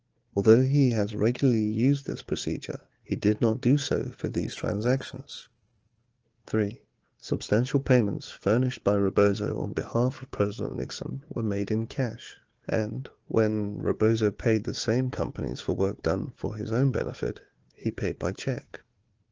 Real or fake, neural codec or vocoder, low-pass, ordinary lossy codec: fake; codec, 16 kHz, 4 kbps, FunCodec, trained on Chinese and English, 50 frames a second; 7.2 kHz; Opus, 16 kbps